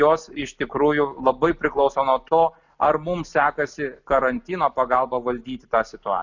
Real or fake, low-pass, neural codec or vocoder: real; 7.2 kHz; none